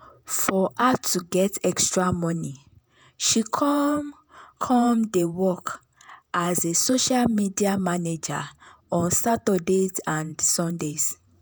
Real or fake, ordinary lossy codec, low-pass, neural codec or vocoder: fake; none; none; vocoder, 48 kHz, 128 mel bands, Vocos